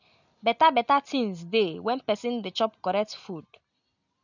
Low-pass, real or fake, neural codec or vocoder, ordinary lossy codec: 7.2 kHz; real; none; none